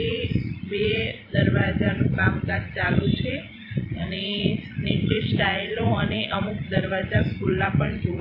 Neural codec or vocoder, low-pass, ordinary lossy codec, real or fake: vocoder, 44.1 kHz, 80 mel bands, Vocos; 5.4 kHz; AAC, 32 kbps; fake